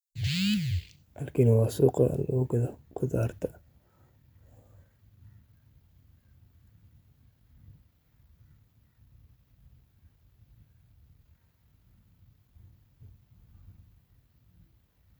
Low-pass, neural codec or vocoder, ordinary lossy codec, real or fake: none; vocoder, 44.1 kHz, 128 mel bands every 256 samples, BigVGAN v2; none; fake